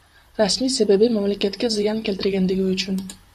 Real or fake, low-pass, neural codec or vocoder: fake; 14.4 kHz; vocoder, 44.1 kHz, 128 mel bands, Pupu-Vocoder